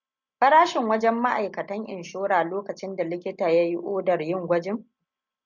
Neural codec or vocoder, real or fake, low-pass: none; real; 7.2 kHz